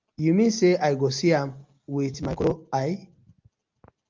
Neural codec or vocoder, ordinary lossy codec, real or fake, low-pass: none; Opus, 24 kbps; real; 7.2 kHz